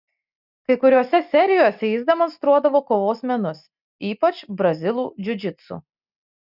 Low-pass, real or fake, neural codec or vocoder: 5.4 kHz; real; none